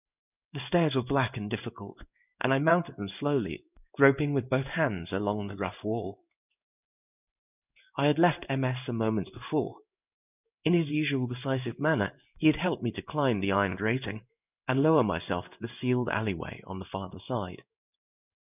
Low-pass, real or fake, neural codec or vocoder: 3.6 kHz; fake; codec, 16 kHz in and 24 kHz out, 1 kbps, XY-Tokenizer